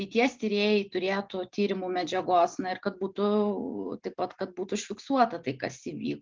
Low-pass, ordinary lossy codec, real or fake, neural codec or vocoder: 7.2 kHz; Opus, 32 kbps; real; none